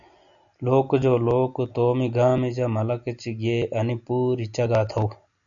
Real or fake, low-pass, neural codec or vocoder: real; 7.2 kHz; none